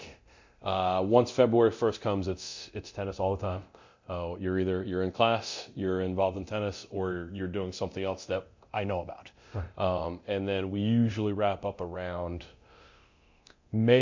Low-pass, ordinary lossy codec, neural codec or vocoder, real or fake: 7.2 kHz; MP3, 48 kbps; codec, 24 kHz, 0.9 kbps, DualCodec; fake